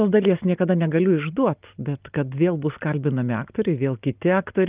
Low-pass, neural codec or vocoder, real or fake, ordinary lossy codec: 3.6 kHz; none; real; Opus, 24 kbps